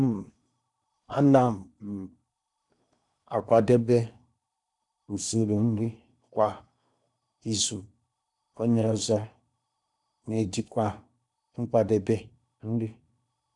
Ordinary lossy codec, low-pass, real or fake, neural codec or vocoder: none; 10.8 kHz; fake; codec, 16 kHz in and 24 kHz out, 0.8 kbps, FocalCodec, streaming, 65536 codes